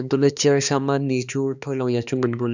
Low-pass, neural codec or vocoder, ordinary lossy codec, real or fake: 7.2 kHz; codec, 16 kHz, 2 kbps, X-Codec, HuBERT features, trained on balanced general audio; none; fake